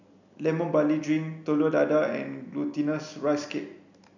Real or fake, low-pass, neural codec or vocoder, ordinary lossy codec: real; 7.2 kHz; none; none